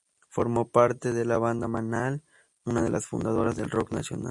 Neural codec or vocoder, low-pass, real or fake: none; 10.8 kHz; real